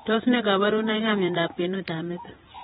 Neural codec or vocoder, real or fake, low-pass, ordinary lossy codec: vocoder, 48 kHz, 128 mel bands, Vocos; fake; 19.8 kHz; AAC, 16 kbps